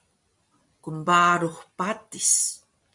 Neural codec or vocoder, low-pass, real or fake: none; 10.8 kHz; real